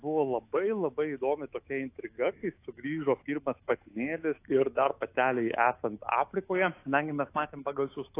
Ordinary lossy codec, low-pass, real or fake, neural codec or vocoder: AAC, 24 kbps; 3.6 kHz; fake; autoencoder, 48 kHz, 128 numbers a frame, DAC-VAE, trained on Japanese speech